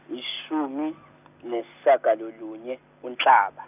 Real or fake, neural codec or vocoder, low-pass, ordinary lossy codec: real; none; 3.6 kHz; none